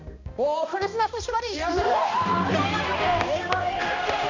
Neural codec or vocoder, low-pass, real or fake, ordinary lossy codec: codec, 16 kHz, 1 kbps, X-Codec, HuBERT features, trained on balanced general audio; 7.2 kHz; fake; MP3, 64 kbps